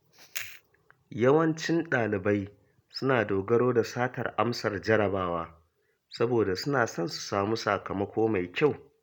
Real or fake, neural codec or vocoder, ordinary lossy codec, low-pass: real; none; none; 19.8 kHz